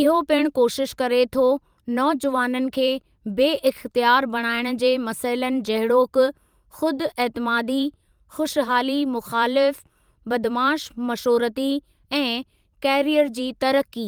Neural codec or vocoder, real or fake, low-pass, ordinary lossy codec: vocoder, 44.1 kHz, 128 mel bands every 512 samples, BigVGAN v2; fake; 19.8 kHz; Opus, 32 kbps